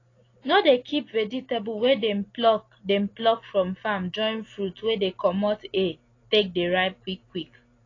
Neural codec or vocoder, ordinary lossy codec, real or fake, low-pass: none; AAC, 32 kbps; real; 7.2 kHz